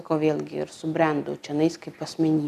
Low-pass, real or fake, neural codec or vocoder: 14.4 kHz; real; none